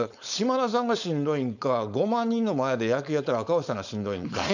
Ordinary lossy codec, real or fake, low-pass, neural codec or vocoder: none; fake; 7.2 kHz; codec, 16 kHz, 4.8 kbps, FACodec